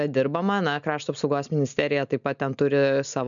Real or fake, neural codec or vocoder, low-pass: real; none; 7.2 kHz